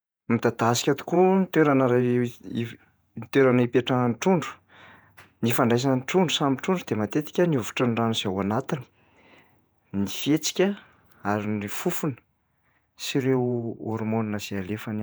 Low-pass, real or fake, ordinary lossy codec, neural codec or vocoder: none; fake; none; vocoder, 48 kHz, 128 mel bands, Vocos